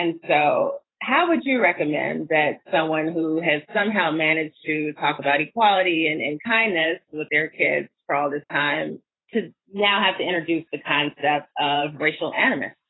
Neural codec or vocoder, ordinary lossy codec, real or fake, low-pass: vocoder, 44.1 kHz, 128 mel bands every 512 samples, BigVGAN v2; AAC, 16 kbps; fake; 7.2 kHz